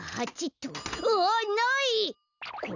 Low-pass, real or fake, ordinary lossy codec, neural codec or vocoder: 7.2 kHz; real; none; none